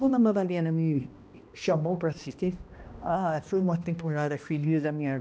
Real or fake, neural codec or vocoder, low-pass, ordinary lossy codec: fake; codec, 16 kHz, 1 kbps, X-Codec, HuBERT features, trained on balanced general audio; none; none